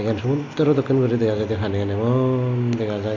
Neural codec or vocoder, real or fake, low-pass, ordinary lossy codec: none; real; 7.2 kHz; AAC, 48 kbps